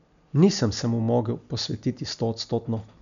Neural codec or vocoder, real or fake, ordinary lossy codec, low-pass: none; real; none; 7.2 kHz